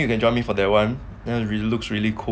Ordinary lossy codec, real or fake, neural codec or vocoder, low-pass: none; real; none; none